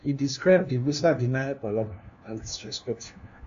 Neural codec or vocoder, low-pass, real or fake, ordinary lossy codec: codec, 16 kHz, 1 kbps, FunCodec, trained on LibriTTS, 50 frames a second; 7.2 kHz; fake; AAC, 64 kbps